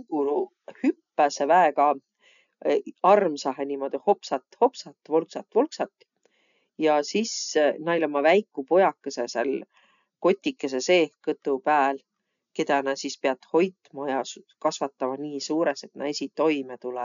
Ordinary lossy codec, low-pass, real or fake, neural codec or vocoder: none; 7.2 kHz; real; none